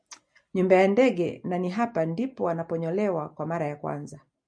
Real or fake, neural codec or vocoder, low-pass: real; none; 9.9 kHz